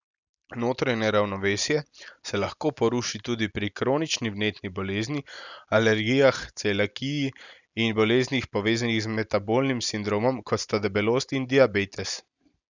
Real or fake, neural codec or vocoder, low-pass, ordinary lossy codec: real; none; 7.2 kHz; none